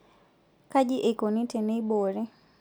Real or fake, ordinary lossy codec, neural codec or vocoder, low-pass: real; none; none; none